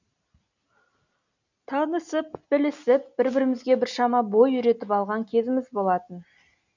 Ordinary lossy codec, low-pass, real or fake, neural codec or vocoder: none; 7.2 kHz; real; none